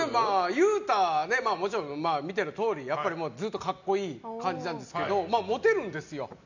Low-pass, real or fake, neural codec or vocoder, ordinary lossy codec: 7.2 kHz; real; none; none